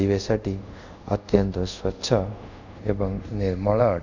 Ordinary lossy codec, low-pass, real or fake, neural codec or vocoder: none; 7.2 kHz; fake; codec, 24 kHz, 0.5 kbps, DualCodec